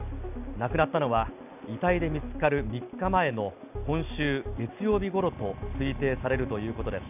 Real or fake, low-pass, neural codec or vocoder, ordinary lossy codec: real; 3.6 kHz; none; none